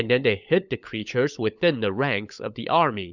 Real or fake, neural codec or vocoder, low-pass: fake; codec, 16 kHz, 16 kbps, FunCodec, trained on LibriTTS, 50 frames a second; 7.2 kHz